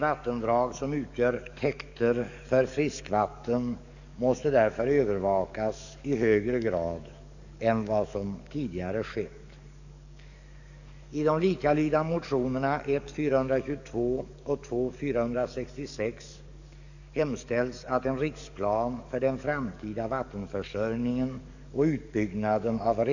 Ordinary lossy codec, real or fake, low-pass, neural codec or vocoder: none; fake; 7.2 kHz; codec, 44.1 kHz, 7.8 kbps, DAC